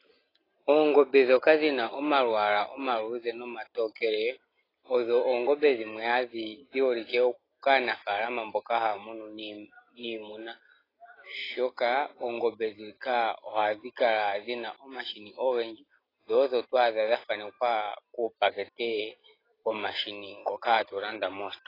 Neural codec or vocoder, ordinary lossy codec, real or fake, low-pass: none; AAC, 24 kbps; real; 5.4 kHz